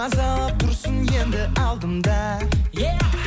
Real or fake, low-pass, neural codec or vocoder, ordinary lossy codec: real; none; none; none